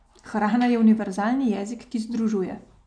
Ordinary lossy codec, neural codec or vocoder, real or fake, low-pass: none; none; real; 9.9 kHz